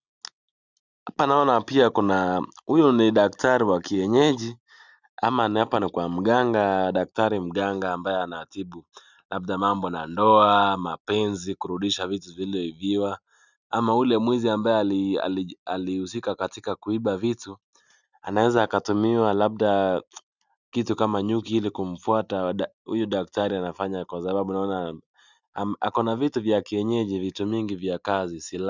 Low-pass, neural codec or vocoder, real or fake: 7.2 kHz; none; real